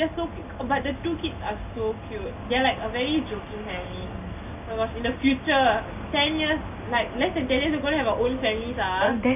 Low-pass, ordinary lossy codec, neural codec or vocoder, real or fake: 3.6 kHz; none; none; real